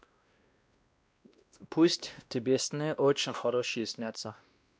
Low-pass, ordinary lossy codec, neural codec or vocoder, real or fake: none; none; codec, 16 kHz, 1 kbps, X-Codec, WavLM features, trained on Multilingual LibriSpeech; fake